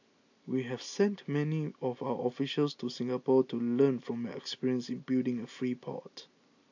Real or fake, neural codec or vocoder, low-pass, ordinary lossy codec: real; none; 7.2 kHz; AAC, 48 kbps